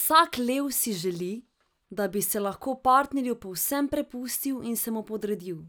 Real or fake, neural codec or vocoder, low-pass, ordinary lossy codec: real; none; none; none